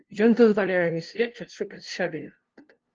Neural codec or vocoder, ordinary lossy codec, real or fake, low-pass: codec, 16 kHz, 0.5 kbps, FunCodec, trained on LibriTTS, 25 frames a second; Opus, 16 kbps; fake; 7.2 kHz